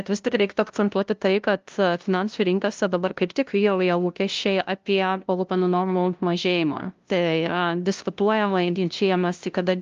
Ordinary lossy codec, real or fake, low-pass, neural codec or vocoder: Opus, 32 kbps; fake; 7.2 kHz; codec, 16 kHz, 0.5 kbps, FunCodec, trained on LibriTTS, 25 frames a second